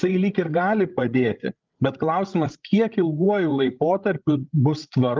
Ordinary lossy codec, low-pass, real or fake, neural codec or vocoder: Opus, 32 kbps; 7.2 kHz; fake; codec, 16 kHz, 16 kbps, FreqCodec, larger model